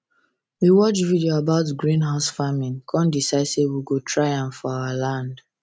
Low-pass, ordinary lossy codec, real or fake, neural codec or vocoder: none; none; real; none